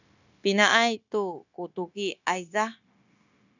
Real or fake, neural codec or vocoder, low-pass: fake; codec, 16 kHz, 0.9 kbps, LongCat-Audio-Codec; 7.2 kHz